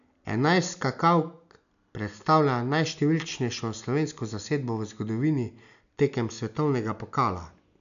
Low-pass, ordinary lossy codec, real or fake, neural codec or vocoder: 7.2 kHz; none; real; none